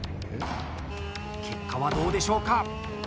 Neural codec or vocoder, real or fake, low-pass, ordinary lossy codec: none; real; none; none